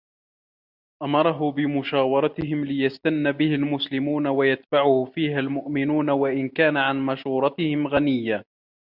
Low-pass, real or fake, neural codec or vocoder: 5.4 kHz; real; none